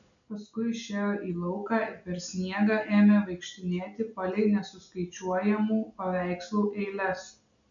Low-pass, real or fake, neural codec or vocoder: 7.2 kHz; real; none